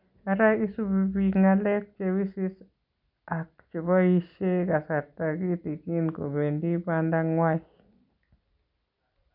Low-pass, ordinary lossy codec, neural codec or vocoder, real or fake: 5.4 kHz; none; none; real